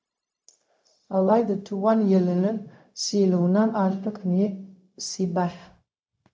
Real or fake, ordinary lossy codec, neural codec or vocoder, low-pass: fake; none; codec, 16 kHz, 0.4 kbps, LongCat-Audio-Codec; none